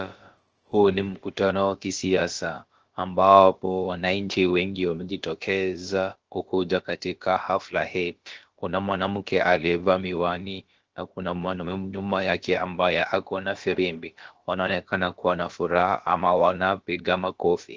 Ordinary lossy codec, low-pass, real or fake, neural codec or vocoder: Opus, 16 kbps; 7.2 kHz; fake; codec, 16 kHz, about 1 kbps, DyCAST, with the encoder's durations